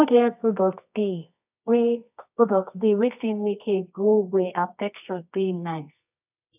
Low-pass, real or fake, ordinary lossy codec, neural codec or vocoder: 3.6 kHz; fake; none; codec, 24 kHz, 0.9 kbps, WavTokenizer, medium music audio release